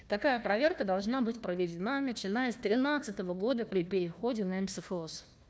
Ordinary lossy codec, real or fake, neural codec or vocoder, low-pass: none; fake; codec, 16 kHz, 1 kbps, FunCodec, trained on Chinese and English, 50 frames a second; none